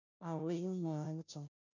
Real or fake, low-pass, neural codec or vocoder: fake; 7.2 kHz; codec, 16 kHz, 0.5 kbps, FunCodec, trained on Chinese and English, 25 frames a second